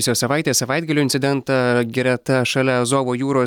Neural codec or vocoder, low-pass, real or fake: none; 19.8 kHz; real